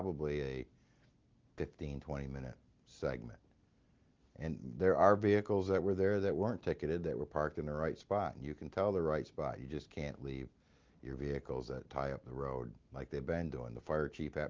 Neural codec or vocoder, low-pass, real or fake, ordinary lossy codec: none; 7.2 kHz; real; Opus, 32 kbps